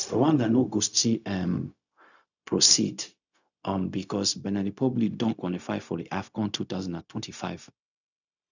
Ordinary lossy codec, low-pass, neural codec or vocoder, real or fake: none; 7.2 kHz; codec, 16 kHz, 0.4 kbps, LongCat-Audio-Codec; fake